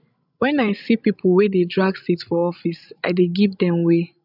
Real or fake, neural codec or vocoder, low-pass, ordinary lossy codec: fake; codec, 16 kHz, 16 kbps, FreqCodec, larger model; 5.4 kHz; none